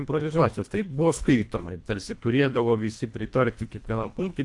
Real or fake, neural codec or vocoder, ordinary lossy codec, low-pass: fake; codec, 24 kHz, 1.5 kbps, HILCodec; AAC, 64 kbps; 10.8 kHz